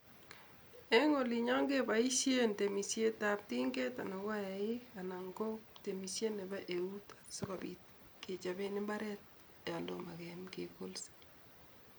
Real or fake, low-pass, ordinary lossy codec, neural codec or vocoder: real; none; none; none